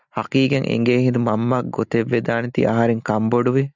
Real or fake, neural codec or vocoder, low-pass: real; none; 7.2 kHz